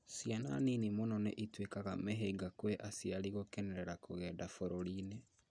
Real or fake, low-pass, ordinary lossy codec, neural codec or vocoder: real; 9.9 kHz; none; none